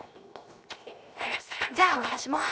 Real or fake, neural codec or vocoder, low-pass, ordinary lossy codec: fake; codec, 16 kHz, 0.7 kbps, FocalCodec; none; none